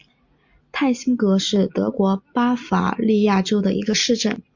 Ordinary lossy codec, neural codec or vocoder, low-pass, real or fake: MP3, 64 kbps; none; 7.2 kHz; real